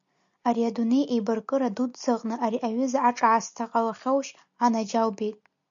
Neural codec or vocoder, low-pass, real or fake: none; 7.2 kHz; real